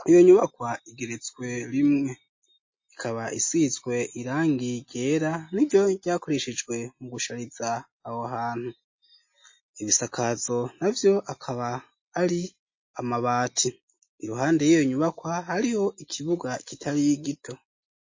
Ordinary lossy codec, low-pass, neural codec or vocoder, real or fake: MP3, 32 kbps; 7.2 kHz; none; real